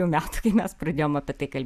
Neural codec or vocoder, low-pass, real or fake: none; 14.4 kHz; real